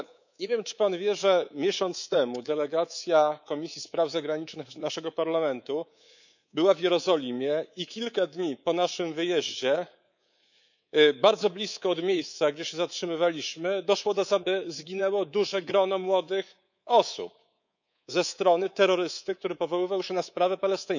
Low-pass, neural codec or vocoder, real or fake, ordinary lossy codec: 7.2 kHz; codec, 24 kHz, 3.1 kbps, DualCodec; fake; none